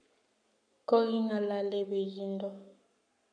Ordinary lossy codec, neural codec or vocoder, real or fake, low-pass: none; codec, 44.1 kHz, 7.8 kbps, Pupu-Codec; fake; 9.9 kHz